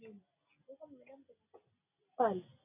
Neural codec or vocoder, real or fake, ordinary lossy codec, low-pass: none; real; AAC, 32 kbps; 3.6 kHz